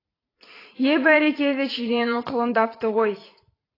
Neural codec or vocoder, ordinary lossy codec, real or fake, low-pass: vocoder, 44.1 kHz, 80 mel bands, Vocos; AAC, 24 kbps; fake; 5.4 kHz